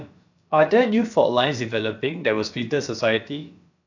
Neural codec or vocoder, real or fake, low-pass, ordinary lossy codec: codec, 16 kHz, about 1 kbps, DyCAST, with the encoder's durations; fake; 7.2 kHz; none